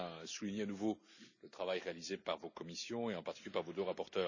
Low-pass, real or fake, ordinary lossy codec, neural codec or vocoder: 7.2 kHz; real; none; none